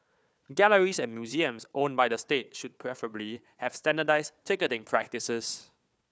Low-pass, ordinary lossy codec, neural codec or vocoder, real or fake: none; none; codec, 16 kHz, 4 kbps, FunCodec, trained on Chinese and English, 50 frames a second; fake